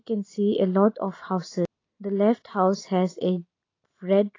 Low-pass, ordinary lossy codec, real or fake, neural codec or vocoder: 7.2 kHz; AAC, 32 kbps; real; none